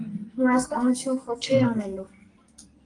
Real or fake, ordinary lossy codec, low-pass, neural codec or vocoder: fake; Opus, 32 kbps; 10.8 kHz; codec, 44.1 kHz, 2.6 kbps, SNAC